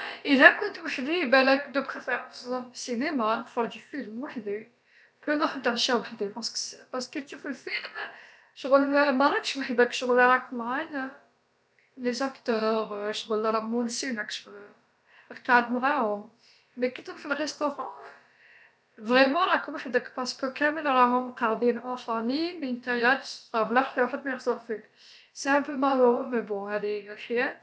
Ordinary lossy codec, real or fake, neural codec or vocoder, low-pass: none; fake; codec, 16 kHz, about 1 kbps, DyCAST, with the encoder's durations; none